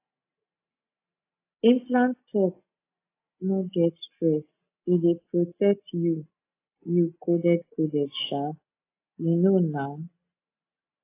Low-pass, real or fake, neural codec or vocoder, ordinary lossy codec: 3.6 kHz; real; none; AAC, 24 kbps